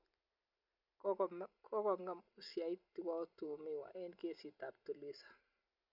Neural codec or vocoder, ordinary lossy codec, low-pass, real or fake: none; none; 5.4 kHz; real